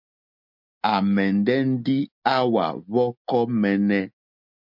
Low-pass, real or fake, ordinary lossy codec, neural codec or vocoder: 5.4 kHz; real; MP3, 48 kbps; none